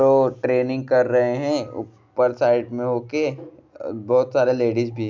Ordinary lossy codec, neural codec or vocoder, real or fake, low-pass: MP3, 64 kbps; none; real; 7.2 kHz